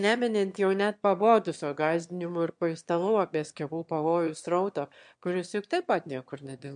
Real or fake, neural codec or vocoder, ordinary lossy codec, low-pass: fake; autoencoder, 22.05 kHz, a latent of 192 numbers a frame, VITS, trained on one speaker; MP3, 64 kbps; 9.9 kHz